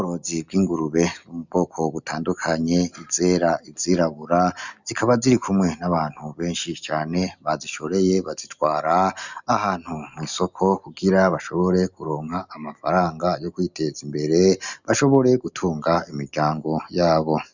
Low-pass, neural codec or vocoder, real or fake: 7.2 kHz; none; real